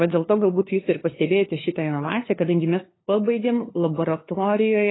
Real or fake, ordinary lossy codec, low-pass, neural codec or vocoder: fake; AAC, 16 kbps; 7.2 kHz; codec, 16 kHz, 2 kbps, FunCodec, trained on LibriTTS, 25 frames a second